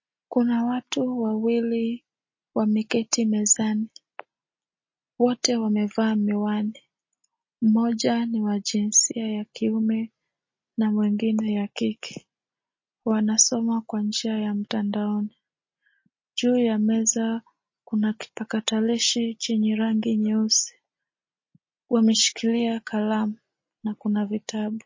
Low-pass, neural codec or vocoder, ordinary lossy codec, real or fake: 7.2 kHz; none; MP3, 32 kbps; real